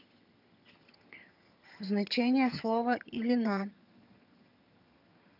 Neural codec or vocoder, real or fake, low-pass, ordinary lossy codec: vocoder, 22.05 kHz, 80 mel bands, HiFi-GAN; fake; 5.4 kHz; none